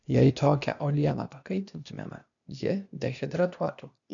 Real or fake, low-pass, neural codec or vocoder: fake; 7.2 kHz; codec, 16 kHz, 0.8 kbps, ZipCodec